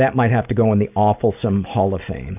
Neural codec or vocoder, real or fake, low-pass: none; real; 3.6 kHz